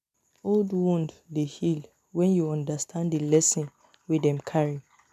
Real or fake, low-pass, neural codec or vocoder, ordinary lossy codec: real; 14.4 kHz; none; none